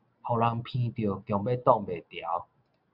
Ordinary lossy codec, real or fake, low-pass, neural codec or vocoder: Opus, 64 kbps; real; 5.4 kHz; none